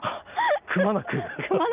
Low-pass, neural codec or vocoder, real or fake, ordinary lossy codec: 3.6 kHz; none; real; Opus, 24 kbps